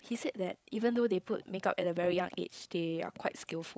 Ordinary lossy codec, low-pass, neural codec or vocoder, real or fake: none; none; codec, 16 kHz, 8 kbps, FreqCodec, larger model; fake